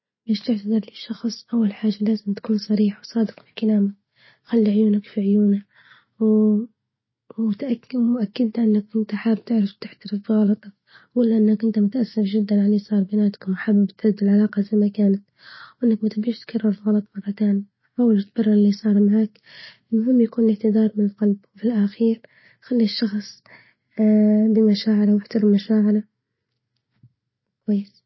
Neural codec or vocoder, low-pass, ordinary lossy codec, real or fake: none; 7.2 kHz; MP3, 24 kbps; real